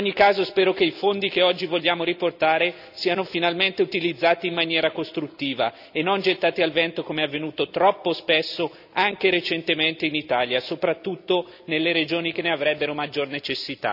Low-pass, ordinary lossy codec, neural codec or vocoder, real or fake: 5.4 kHz; none; none; real